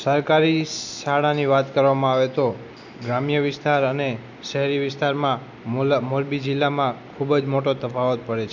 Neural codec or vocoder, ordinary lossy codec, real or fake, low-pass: none; none; real; 7.2 kHz